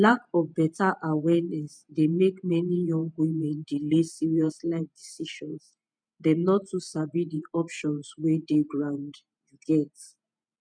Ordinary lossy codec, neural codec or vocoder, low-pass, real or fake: none; vocoder, 48 kHz, 128 mel bands, Vocos; 9.9 kHz; fake